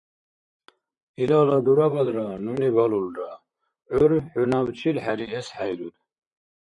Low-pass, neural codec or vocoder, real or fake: 10.8 kHz; vocoder, 44.1 kHz, 128 mel bands, Pupu-Vocoder; fake